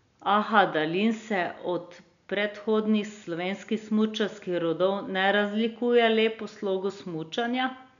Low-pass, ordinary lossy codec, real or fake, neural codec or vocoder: 7.2 kHz; none; real; none